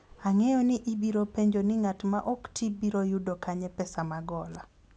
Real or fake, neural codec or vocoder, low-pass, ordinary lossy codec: real; none; 10.8 kHz; none